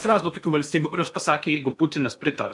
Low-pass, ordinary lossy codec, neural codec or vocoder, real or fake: 10.8 kHz; MP3, 96 kbps; codec, 16 kHz in and 24 kHz out, 0.8 kbps, FocalCodec, streaming, 65536 codes; fake